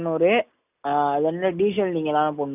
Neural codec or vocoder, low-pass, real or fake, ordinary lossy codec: none; 3.6 kHz; real; none